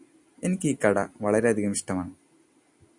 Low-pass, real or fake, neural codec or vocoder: 10.8 kHz; real; none